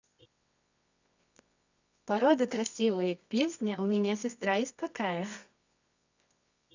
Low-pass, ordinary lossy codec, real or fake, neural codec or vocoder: 7.2 kHz; none; fake; codec, 24 kHz, 0.9 kbps, WavTokenizer, medium music audio release